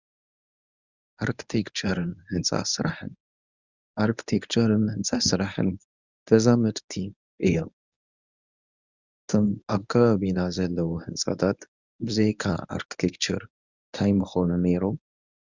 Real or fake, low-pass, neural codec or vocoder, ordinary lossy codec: fake; 7.2 kHz; codec, 24 kHz, 0.9 kbps, WavTokenizer, medium speech release version 1; Opus, 64 kbps